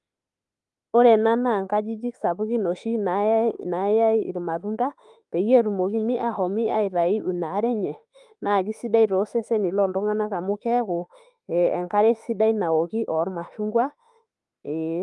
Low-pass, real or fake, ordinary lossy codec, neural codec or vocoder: 10.8 kHz; fake; Opus, 32 kbps; autoencoder, 48 kHz, 32 numbers a frame, DAC-VAE, trained on Japanese speech